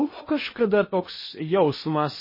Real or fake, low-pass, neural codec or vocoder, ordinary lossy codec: fake; 5.4 kHz; codec, 16 kHz in and 24 kHz out, 0.6 kbps, FocalCodec, streaming, 2048 codes; MP3, 24 kbps